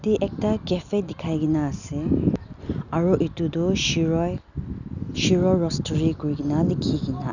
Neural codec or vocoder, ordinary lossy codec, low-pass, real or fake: none; none; 7.2 kHz; real